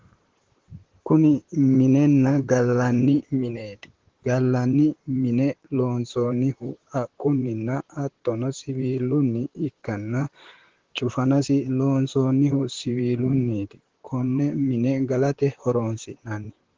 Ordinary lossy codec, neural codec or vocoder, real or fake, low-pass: Opus, 16 kbps; vocoder, 44.1 kHz, 128 mel bands, Pupu-Vocoder; fake; 7.2 kHz